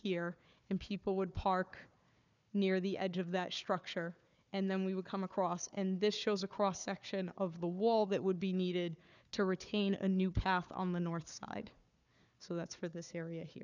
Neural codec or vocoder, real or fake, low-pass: codec, 16 kHz, 4 kbps, FunCodec, trained on Chinese and English, 50 frames a second; fake; 7.2 kHz